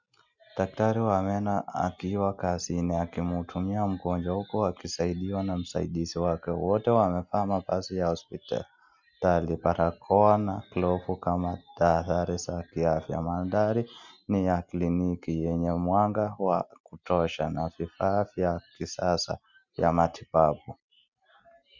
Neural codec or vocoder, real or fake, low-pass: none; real; 7.2 kHz